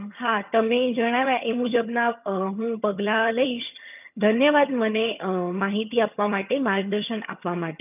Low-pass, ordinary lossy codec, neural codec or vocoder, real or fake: 3.6 kHz; none; vocoder, 22.05 kHz, 80 mel bands, HiFi-GAN; fake